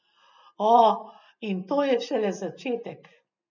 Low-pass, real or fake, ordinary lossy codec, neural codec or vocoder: 7.2 kHz; real; none; none